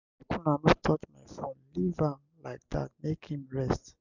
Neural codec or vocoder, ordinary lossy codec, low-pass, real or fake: none; none; 7.2 kHz; real